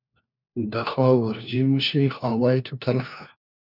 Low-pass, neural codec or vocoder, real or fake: 5.4 kHz; codec, 16 kHz, 1 kbps, FunCodec, trained on LibriTTS, 50 frames a second; fake